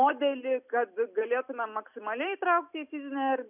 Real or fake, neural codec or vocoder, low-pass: real; none; 3.6 kHz